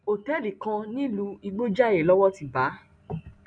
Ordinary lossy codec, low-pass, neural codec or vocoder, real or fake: none; 9.9 kHz; none; real